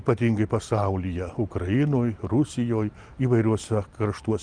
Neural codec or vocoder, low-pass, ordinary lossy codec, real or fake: none; 9.9 kHz; Opus, 32 kbps; real